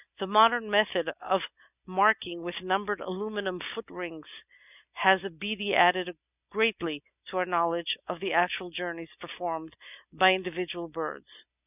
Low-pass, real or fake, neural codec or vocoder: 3.6 kHz; real; none